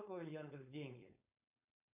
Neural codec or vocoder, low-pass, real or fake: codec, 16 kHz, 4.8 kbps, FACodec; 3.6 kHz; fake